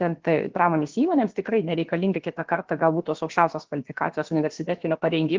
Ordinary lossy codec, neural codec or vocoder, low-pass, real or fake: Opus, 16 kbps; codec, 16 kHz, about 1 kbps, DyCAST, with the encoder's durations; 7.2 kHz; fake